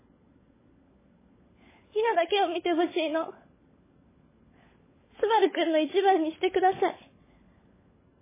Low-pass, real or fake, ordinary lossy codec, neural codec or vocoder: 3.6 kHz; fake; MP3, 16 kbps; codec, 16 kHz, 16 kbps, FunCodec, trained on LibriTTS, 50 frames a second